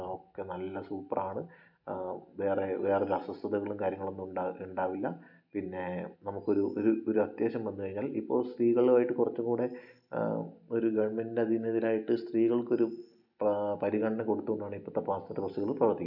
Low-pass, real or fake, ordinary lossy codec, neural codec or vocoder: 5.4 kHz; real; none; none